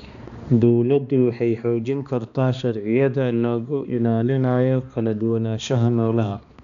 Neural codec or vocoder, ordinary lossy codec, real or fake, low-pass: codec, 16 kHz, 2 kbps, X-Codec, HuBERT features, trained on balanced general audio; none; fake; 7.2 kHz